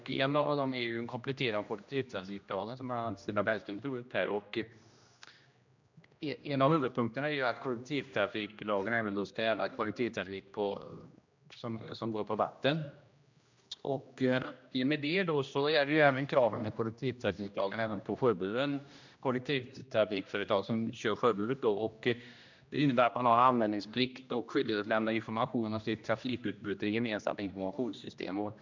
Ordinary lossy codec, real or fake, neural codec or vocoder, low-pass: MP3, 64 kbps; fake; codec, 16 kHz, 1 kbps, X-Codec, HuBERT features, trained on general audio; 7.2 kHz